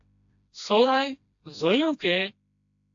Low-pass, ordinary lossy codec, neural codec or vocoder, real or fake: 7.2 kHz; AAC, 64 kbps; codec, 16 kHz, 1 kbps, FreqCodec, smaller model; fake